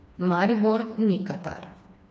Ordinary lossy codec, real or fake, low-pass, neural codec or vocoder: none; fake; none; codec, 16 kHz, 2 kbps, FreqCodec, smaller model